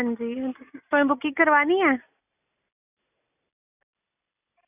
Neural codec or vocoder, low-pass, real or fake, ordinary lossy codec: none; 3.6 kHz; real; none